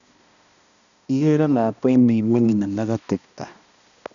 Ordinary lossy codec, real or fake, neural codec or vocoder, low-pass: none; fake; codec, 16 kHz, 1 kbps, X-Codec, HuBERT features, trained on balanced general audio; 7.2 kHz